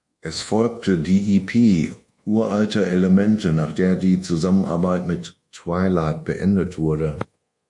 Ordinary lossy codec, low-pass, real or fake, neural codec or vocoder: MP3, 48 kbps; 10.8 kHz; fake; codec, 24 kHz, 1.2 kbps, DualCodec